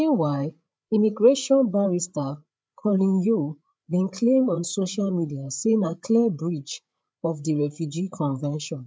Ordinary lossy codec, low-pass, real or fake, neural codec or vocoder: none; none; fake; codec, 16 kHz, 8 kbps, FreqCodec, larger model